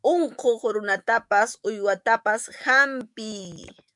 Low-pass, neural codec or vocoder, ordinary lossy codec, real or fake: 10.8 kHz; autoencoder, 48 kHz, 128 numbers a frame, DAC-VAE, trained on Japanese speech; MP3, 96 kbps; fake